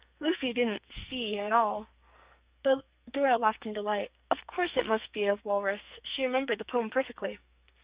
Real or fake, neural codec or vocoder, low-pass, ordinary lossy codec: fake; codec, 44.1 kHz, 2.6 kbps, SNAC; 3.6 kHz; Opus, 64 kbps